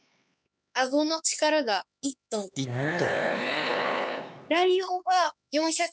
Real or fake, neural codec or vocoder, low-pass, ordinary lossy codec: fake; codec, 16 kHz, 4 kbps, X-Codec, HuBERT features, trained on LibriSpeech; none; none